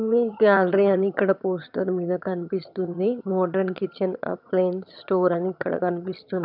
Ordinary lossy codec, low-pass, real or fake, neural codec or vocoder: none; 5.4 kHz; fake; vocoder, 22.05 kHz, 80 mel bands, HiFi-GAN